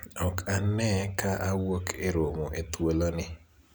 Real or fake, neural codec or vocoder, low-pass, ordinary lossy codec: real; none; none; none